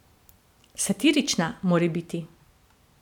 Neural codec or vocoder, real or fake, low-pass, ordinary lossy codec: none; real; 19.8 kHz; none